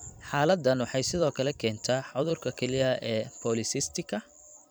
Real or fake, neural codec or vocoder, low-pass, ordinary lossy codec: fake; vocoder, 44.1 kHz, 128 mel bands every 256 samples, BigVGAN v2; none; none